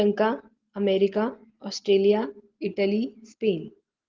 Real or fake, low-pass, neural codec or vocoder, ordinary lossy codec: real; 7.2 kHz; none; Opus, 16 kbps